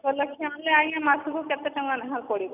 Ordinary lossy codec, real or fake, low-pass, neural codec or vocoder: none; real; 3.6 kHz; none